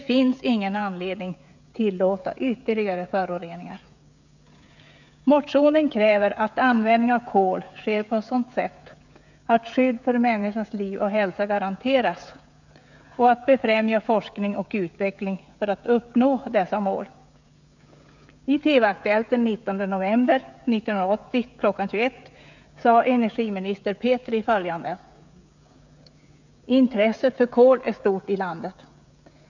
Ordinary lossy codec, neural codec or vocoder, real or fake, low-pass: none; codec, 16 kHz, 16 kbps, FreqCodec, smaller model; fake; 7.2 kHz